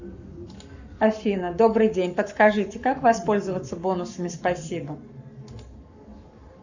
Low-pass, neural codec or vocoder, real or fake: 7.2 kHz; codec, 44.1 kHz, 7.8 kbps, Pupu-Codec; fake